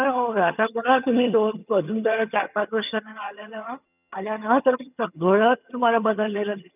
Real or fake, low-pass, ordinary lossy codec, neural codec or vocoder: fake; 3.6 kHz; none; vocoder, 22.05 kHz, 80 mel bands, HiFi-GAN